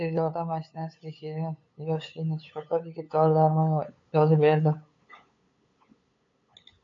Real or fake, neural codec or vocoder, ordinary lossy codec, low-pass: fake; codec, 16 kHz, 8 kbps, FunCodec, trained on LibriTTS, 25 frames a second; AAC, 48 kbps; 7.2 kHz